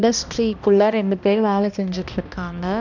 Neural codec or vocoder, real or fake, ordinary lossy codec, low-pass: codec, 16 kHz, 1 kbps, X-Codec, HuBERT features, trained on balanced general audio; fake; none; 7.2 kHz